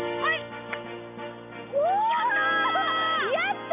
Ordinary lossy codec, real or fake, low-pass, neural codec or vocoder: none; real; 3.6 kHz; none